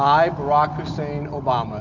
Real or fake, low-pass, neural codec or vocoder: real; 7.2 kHz; none